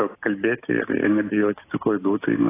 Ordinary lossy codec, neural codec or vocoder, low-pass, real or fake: AAC, 16 kbps; none; 3.6 kHz; real